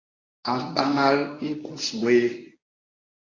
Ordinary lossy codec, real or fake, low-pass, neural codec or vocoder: AAC, 32 kbps; fake; 7.2 kHz; codec, 24 kHz, 0.9 kbps, WavTokenizer, medium speech release version 1